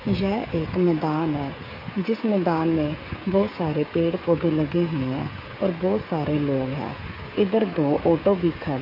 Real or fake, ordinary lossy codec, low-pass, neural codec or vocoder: fake; AAC, 24 kbps; 5.4 kHz; codec, 16 kHz, 16 kbps, FreqCodec, smaller model